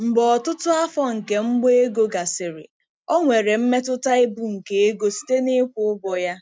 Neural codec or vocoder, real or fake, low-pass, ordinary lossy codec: none; real; none; none